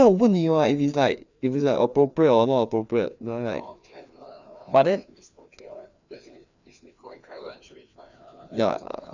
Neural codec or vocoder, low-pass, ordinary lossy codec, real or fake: codec, 16 kHz, 2 kbps, FreqCodec, larger model; 7.2 kHz; none; fake